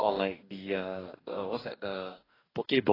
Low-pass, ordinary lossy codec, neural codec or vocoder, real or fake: 5.4 kHz; AAC, 24 kbps; codec, 44.1 kHz, 2.6 kbps, DAC; fake